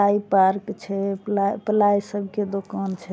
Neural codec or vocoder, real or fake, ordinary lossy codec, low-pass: none; real; none; none